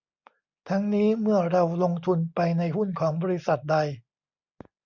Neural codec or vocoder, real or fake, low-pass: none; real; 7.2 kHz